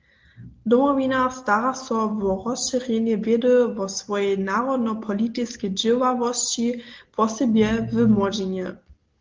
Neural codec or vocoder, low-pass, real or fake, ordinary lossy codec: none; 7.2 kHz; real; Opus, 16 kbps